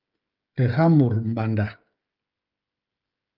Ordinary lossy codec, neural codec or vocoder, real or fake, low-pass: Opus, 24 kbps; codec, 16 kHz, 16 kbps, FreqCodec, smaller model; fake; 5.4 kHz